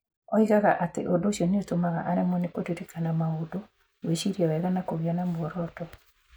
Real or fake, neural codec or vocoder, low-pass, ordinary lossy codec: real; none; none; none